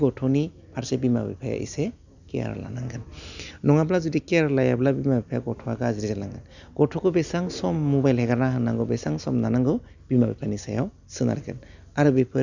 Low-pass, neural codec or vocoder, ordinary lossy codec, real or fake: 7.2 kHz; none; none; real